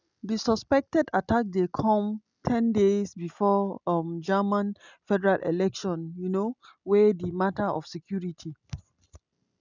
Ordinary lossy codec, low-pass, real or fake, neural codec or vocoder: none; 7.2 kHz; real; none